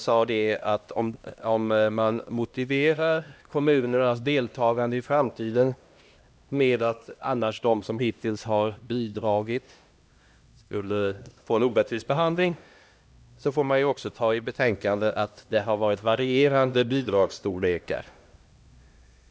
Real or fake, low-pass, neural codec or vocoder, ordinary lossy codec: fake; none; codec, 16 kHz, 1 kbps, X-Codec, HuBERT features, trained on LibriSpeech; none